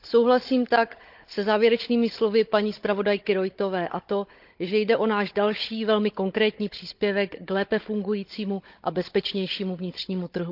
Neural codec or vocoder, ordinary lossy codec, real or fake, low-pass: codec, 16 kHz, 16 kbps, FunCodec, trained on Chinese and English, 50 frames a second; Opus, 32 kbps; fake; 5.4 kHz